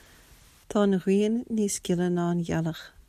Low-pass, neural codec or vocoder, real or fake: 14.4 kHz; none; real